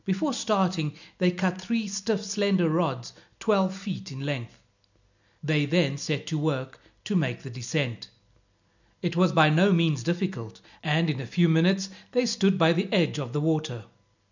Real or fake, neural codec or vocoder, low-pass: real; none; 7.2 kHz